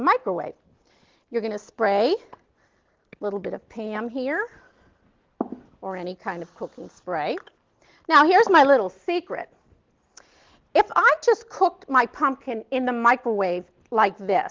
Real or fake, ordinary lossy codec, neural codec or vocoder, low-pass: real; Opus, 16 kbps; none; 7.2 kHz